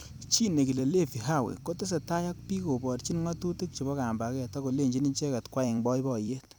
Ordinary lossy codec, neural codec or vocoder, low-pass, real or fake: none; vocoder, 44.1 kHz, 128 mel bands every 256 samples, BigVGAN v2; none; fake